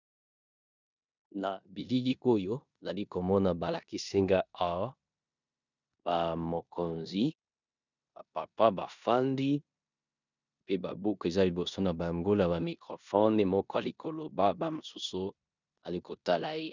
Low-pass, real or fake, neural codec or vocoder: 7.2 kHz; fake; codec, 16 kHz in and 24 kHz out, 0.9 kbps, LongCat-Audio-Codec, four codebook decoder